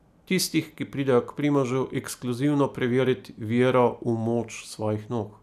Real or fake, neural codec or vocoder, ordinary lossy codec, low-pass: real; none; none; 14.4 kHz